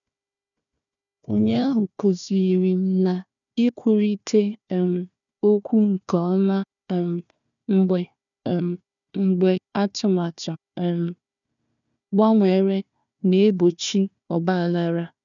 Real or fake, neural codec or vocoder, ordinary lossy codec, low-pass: fake; codec, 16 kHz, 1 kbps, FunCodec, trained on Chinese and English, 50 frames a second; none; 7.2 kHz